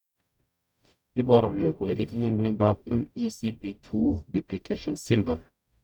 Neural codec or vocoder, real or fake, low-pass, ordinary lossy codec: codec, 44.1 kHz, 0.9 kbps, DAC; fake; 19.8 kHz; none